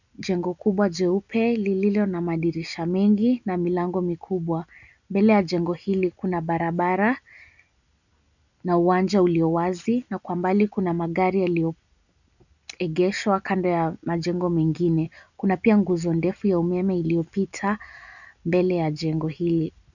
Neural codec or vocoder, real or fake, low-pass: none; real; 7.2 kHz